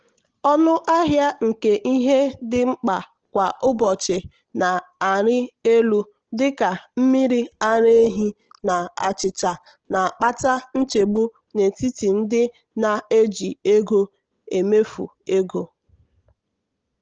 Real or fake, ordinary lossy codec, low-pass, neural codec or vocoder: real; Opus, 16 kbps; 7.2 kHz; none